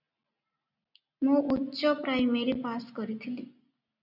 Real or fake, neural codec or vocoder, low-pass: real; none; 5.4 kHz